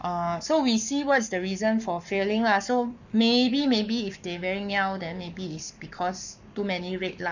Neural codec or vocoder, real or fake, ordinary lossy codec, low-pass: codec, 44.1 kHz, 7.8 kbps, Pupu-Codec; fake; none; 7.2 kHz